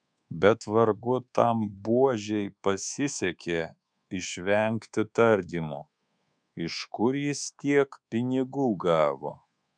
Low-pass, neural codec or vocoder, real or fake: 9.9 kHz; codec, 24 kHz, 1.2 kbps, DualCodec; fake